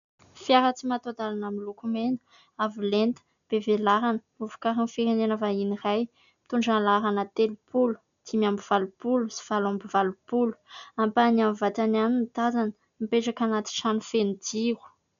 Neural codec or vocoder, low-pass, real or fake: none; 7.2 kHz; real